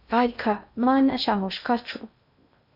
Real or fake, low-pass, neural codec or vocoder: fake; 5.4 kHz; codec, 16 kHz in and 24 kHz out, 0.6 kbps, FocalCodec, streaming, 2048 codes